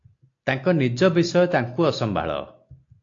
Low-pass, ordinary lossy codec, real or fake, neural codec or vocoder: 7.2 kHz; AAC, 48 kbps; real; none